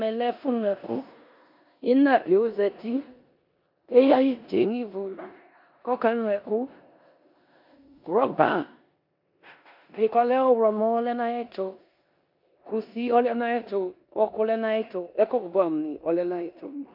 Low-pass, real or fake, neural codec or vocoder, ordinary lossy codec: 5.4 kHz; fake; codec, 16 kHz in and 24 kHz out, 0.9 kbps, LongCat-Audio-Codec, four codebook decoder; MP3, 48 kbps